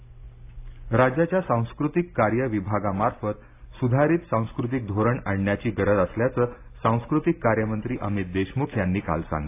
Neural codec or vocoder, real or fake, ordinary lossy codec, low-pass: none; real; AAC, 24 kbps; 3.6 kHz